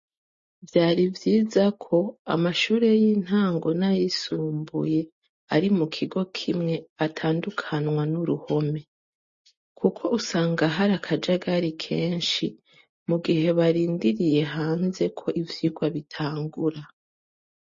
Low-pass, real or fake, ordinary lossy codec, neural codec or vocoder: 7.2 kHz; real; MP3, 32 kbps; none